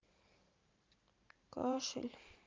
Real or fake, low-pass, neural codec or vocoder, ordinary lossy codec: fake; 7.2 kHz; vocoder, 44.1 kHz, 128 mel bands every 512 samples, BigVGAN v2; Opus, 24 kbps